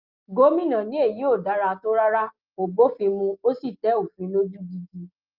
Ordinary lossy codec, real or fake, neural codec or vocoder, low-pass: Opus, 24 kbps; real; none; 5.4 kHz